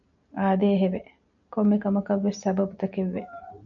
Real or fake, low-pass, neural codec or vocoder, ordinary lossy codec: real; 7.2 kHz; none; MP3, 96 kbps